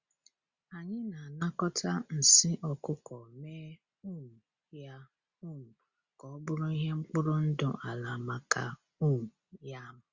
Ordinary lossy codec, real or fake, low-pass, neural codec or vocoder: Opus, 64 kbps; real; 7.2 kHz; none